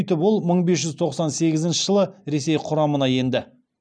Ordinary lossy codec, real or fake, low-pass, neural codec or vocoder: none; real; 9.9 kHz; none